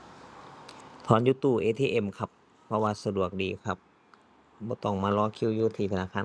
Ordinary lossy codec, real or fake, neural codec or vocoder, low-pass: none; real; none; none